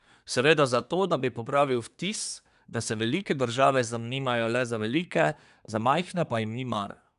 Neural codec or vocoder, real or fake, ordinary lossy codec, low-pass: codec, 24 kHz, 1 kbps, SNAC; fake; none; 10.8 kHz